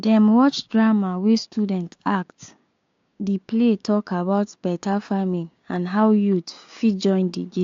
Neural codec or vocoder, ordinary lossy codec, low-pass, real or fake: codec, 16 kHz, 6 kbps, DAC; AAC, 48 kbps; 7.2 kHz; fake